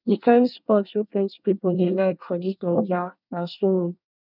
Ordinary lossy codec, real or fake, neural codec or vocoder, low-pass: none; fake; codec, 24 kHz, 1 kbps, SNAC; 5.4 kHz